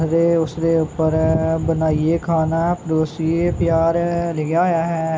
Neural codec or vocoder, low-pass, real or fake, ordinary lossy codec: none; none; real; none